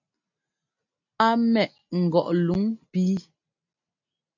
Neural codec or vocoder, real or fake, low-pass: none; real; 7.2 kHz